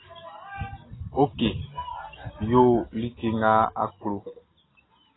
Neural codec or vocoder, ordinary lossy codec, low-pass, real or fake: none; AAC, 16 kbps; 7.2 kHz; real